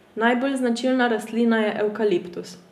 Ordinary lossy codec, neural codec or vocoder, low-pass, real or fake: none; none; 14.4 kHz; real